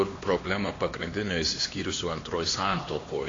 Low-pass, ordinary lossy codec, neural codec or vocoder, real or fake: 7.2 kHz; AAC, 32 kbps; codec, 16 kHz, 2 kbps, X-Codec, HuBERT features, trained on LibriSpeech; fake